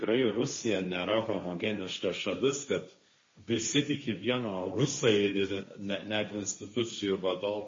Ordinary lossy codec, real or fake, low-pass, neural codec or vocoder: MP3, 32 kbps; fake; 7.2 kHz; codec, 16 kHz, 1.1 kbps, Voila-Tokenizer